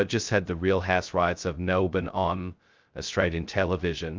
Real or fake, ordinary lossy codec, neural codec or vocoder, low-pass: fake; Opus, 24 kbps; codec, 16 kHz, 0.2 kbps, FocalCodec; 7.2 kHz